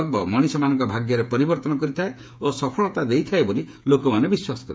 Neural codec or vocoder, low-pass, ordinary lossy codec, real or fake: codec, 16 kHz, 8 kbps, FreqCodec, smaller model; none; none; fake